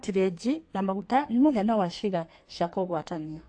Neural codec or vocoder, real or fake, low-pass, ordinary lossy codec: codec, 32 kHz, 1.9 kbps, SNAC; fake; 9.9 kHz; AAC, 48 kbps